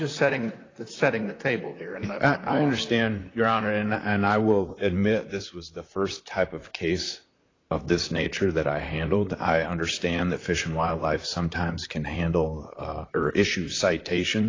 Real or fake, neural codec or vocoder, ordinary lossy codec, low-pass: fake; vocoder, 44.1 kHz, 128 mel bands, Pupu-Vocoder; AAC, 32 kbps; 7.2 kHz